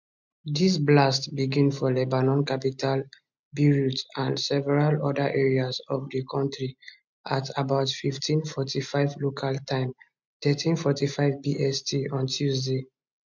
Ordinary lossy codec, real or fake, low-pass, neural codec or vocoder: MP3, 64 kbps; real; 7.2 kHz; none